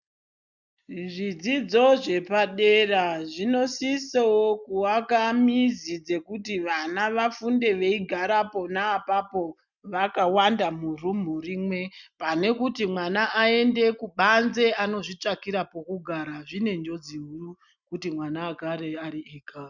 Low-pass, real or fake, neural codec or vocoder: 7.2 kHz; real; none